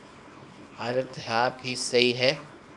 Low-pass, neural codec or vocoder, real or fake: 10.8 kHz; codec, 24 kHz, 0.9 kbps, WavTokenizer, small release; fake